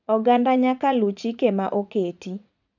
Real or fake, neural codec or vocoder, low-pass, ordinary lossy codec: fake; vocoder, 44.1 kHz, 80 mel bands, Vocos; 7.2 kHz; none